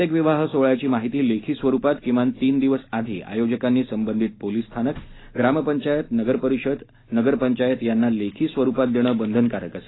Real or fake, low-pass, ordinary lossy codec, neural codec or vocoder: real; 7.2 kHz; AAC, 16 kbps; none